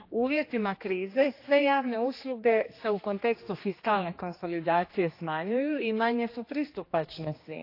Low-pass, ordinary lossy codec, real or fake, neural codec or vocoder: 5.4 kHz; AAC, 32 kbps; fake; codec, 16 kHz, 2 kbps, X-Codec, HuBERT features, trained on general audio